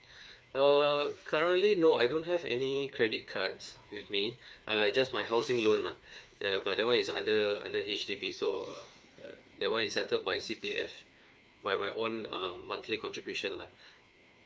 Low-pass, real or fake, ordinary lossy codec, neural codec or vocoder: none; fake; none; codec, 16 kHz, 2 kbps, FreqCodec, larger model